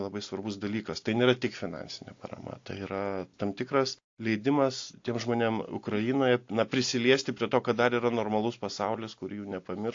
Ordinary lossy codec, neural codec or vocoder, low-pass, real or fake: AAC, 48 kbps; none; 7.2 kHz; real